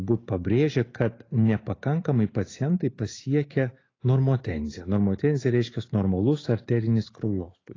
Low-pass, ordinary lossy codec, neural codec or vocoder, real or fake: 7.2 kHz; AAC, 32 kbps; none; real